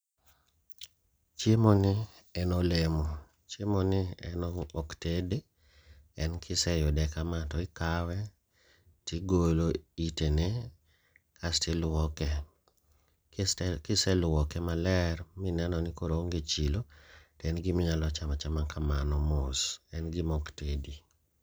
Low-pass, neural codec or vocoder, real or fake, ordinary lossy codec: none; none; real; none